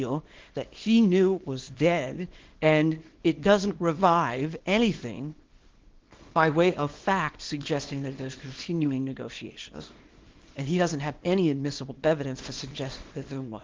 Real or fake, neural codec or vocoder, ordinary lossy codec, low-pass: fake; codec, 24 kHz, 0.9 kbps, WavTokenizer, small release; Opus, 16 kbps; 7.2 kHz